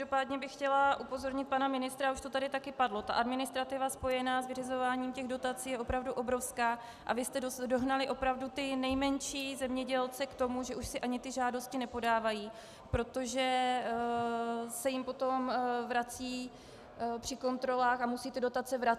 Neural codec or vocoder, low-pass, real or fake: none; 14.4 kHz; real